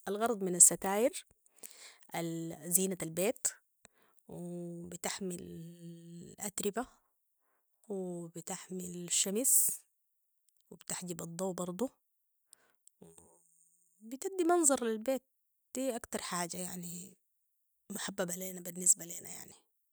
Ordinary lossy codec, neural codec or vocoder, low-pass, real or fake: none; none; none; real